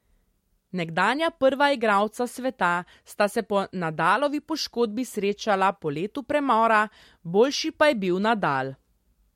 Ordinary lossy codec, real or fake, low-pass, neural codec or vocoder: MP3, 64 kbps; real; 19.8 kHz; none